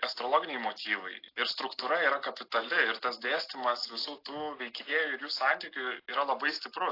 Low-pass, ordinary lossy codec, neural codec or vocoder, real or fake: 5.4 kHz; AAC, 32 kbps; none; real